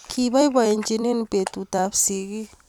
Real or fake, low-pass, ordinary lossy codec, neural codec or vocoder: fake; 19.8 kHz; none; vocoder, 44.1 kHz, 128 mel bands every 256 samples, BigVGAN v2